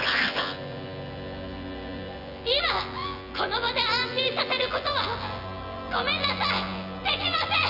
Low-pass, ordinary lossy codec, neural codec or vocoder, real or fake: 5.4 kHz; none; vocoder, 24 kHz, 100 mel bands, Vocos; fake